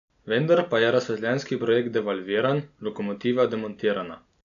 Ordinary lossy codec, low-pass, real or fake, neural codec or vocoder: none; 7.2 kHz; real; none